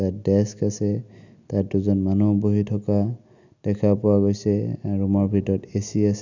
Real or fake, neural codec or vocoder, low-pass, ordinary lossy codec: real; none; 7.2 kHz; none